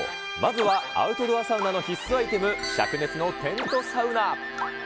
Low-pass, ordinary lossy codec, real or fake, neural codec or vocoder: none; none; real; none